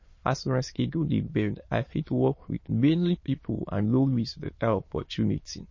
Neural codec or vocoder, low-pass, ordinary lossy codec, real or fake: autoencoder, 22.05 kHz, a latent of 192 numbers a frame, VITS, trained on many speakers; 7.2 kHz; MP3, 32 kbps; fake